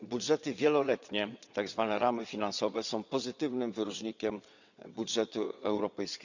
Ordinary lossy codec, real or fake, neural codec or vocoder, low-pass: none; fake; vocoder, 22.05 kHz, 80 mel bands, WaveNeXt; 7.2 kHz